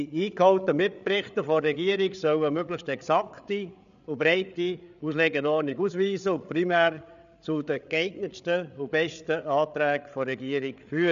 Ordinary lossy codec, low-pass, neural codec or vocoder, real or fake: none; 7.2 kHz; codec, 16 kHz, 8 kbps, FreqCodec, larger model; fake